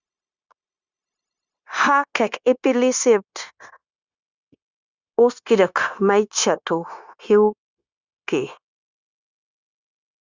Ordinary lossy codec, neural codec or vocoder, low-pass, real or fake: Opus, 64 kbps; codec, 16 kHz, 0.9 kbps, LongCat-Audio-Codec; 7.2 kHz; fake